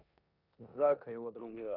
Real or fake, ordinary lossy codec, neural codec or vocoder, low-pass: fake; none; codec, 16 kHz in and 24 kHz out, 0.9 kbps, LongCat-Audio-Codec, four codebook decoder; 5.4 kHz